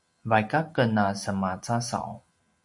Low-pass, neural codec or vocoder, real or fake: 10.8 kHz; none; real